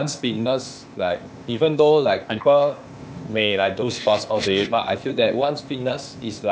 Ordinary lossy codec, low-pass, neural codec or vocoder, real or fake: none; none; codec, 16 kHz, 0.8 kbps, ZipCodec; fake